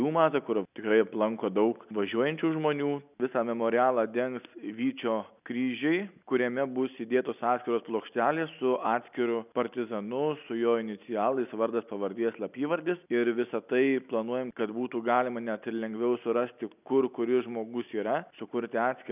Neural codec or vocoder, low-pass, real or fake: none; 3.6 kHz; real